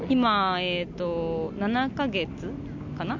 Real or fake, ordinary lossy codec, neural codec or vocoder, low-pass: real; none; none; 7.2 kHz